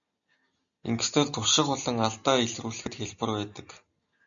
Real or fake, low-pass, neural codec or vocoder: real; 7.2 kHz; none